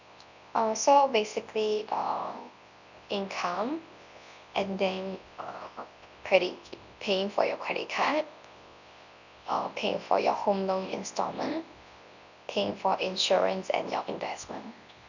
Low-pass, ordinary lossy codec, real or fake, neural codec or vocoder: 7.2 kHz; none; fake; codec, 24 kHz, 0.9 kbps, WavTokenizer, large speech release